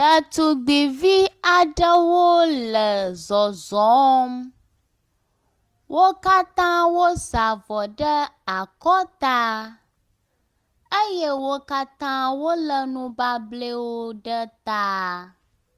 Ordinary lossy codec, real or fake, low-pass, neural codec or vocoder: Opus, 24 kbps; real; 14.4 kHz; none